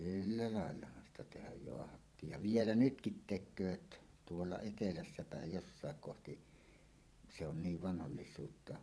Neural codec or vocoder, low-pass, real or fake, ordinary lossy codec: vocoder, 22.05 kHz, 80 mel bands, Vocos; none; fake; none